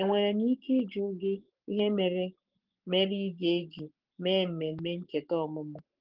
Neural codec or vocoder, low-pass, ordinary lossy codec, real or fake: codec, 44.1 kHz, 7.8 kbps, Pupu-Codec; 5.4 kHz; Opus, 16 kbps; fake